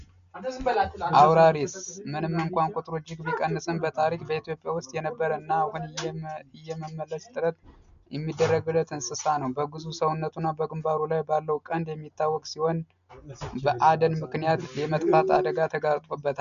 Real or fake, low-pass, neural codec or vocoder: real; 7.2 kHz; none